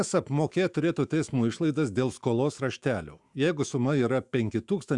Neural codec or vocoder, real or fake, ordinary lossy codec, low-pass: autoencoder, 48 kHz, 128 numbers a frame, DAC-VAE, trained on Japanese speech; fake; Opus, 64 kbps; 10.8 kHz